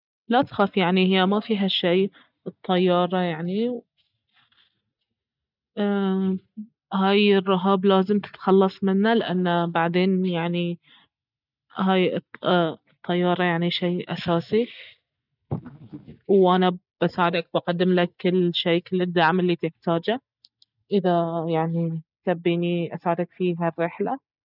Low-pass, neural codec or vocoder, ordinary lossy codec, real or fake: 5.4 kHz; none; none; real